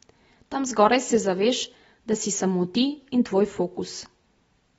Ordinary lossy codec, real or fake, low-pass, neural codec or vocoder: AAC, 24 kbps; real; 19.8 kHz; none